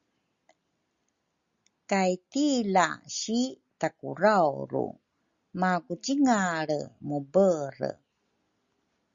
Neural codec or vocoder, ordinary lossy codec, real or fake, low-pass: none; Opus, 64 kbps; real; 7.2 kHz